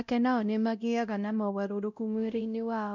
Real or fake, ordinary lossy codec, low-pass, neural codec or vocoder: fake; none; 7.2 kHz; codec, 16 kHz, 0.5 kbps, X-Codec, WavLM features, trained on Multilingual LibriSpeech